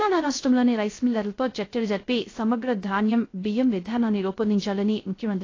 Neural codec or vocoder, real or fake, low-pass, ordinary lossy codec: codec, 16 kHz, 0.3 kbps, FocalCodec; fake; 7.2 kHz; AAC, 32 kbps